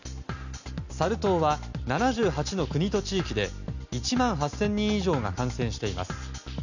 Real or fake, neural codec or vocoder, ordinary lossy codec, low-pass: real; none; none; 7.2 kHz